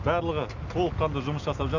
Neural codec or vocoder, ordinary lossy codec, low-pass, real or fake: none; none; 7.2 kHz; real